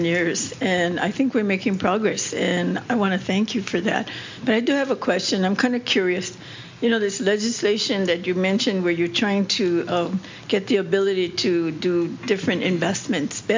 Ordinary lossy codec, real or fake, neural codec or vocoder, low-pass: MP3, 64 kbps; real; none; 7.2 kHz